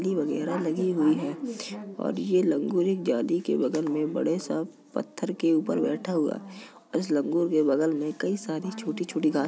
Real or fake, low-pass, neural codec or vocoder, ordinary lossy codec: real; none; none; none